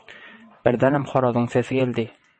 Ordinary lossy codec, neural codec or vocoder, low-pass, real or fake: MP3, 32 kbps; vocoder, 22.05 kHz, 80 mel bands, WaveNeXt; 9.9 kHz; fake